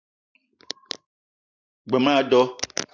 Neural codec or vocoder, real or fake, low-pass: none; real; 7.2 kHz